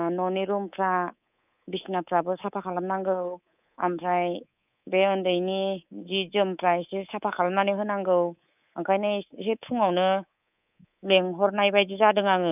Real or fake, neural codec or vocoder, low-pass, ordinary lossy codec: fake; codec, 24 kHz, 3.1 kbps, DualCodec; 3.6 kHz; none